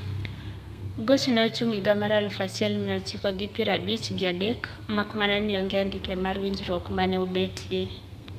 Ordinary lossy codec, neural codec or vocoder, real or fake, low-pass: none; codec, 32 kHz, 1.9 kbps, SNAC; fake; 14.4 kHz